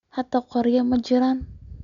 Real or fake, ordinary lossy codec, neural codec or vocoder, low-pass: real; none; none; 7.2 kHz